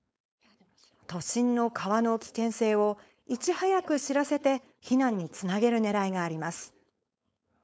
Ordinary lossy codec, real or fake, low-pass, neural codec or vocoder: none; fake; none; codec, 16 kHz, 4.8 kbps, FACodec